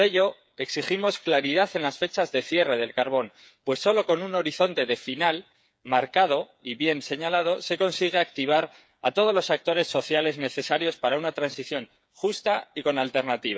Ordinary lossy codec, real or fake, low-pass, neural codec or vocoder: none; fake; none; codec, 16 kHz, 8 kbps, FreqCodec, smaller model